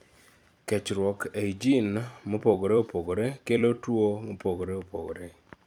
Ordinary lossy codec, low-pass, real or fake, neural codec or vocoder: none; 19.8 kHz; fake; vocoder, 44.1 kHz, 128 mel bands every 256 samples, BigVGAN v2